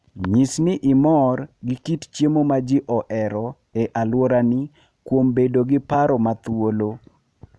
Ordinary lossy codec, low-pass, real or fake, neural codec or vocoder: none; none; real; none